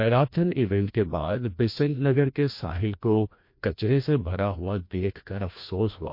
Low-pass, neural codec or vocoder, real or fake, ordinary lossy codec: 5.4 kHz; codec, 16 kHz, 1 kbps, FreqCodec, larger model; fake; MP3, 32 kbps